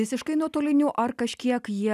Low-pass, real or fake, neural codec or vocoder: 14.4 kHz; real; none